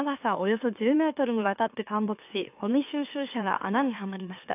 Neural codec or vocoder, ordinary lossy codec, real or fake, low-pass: autoencoder, 44.1 kHz, a latent of 192 numbers a frame, MeloTTS; AAC, 32 kbps; fake; 3.6 kHz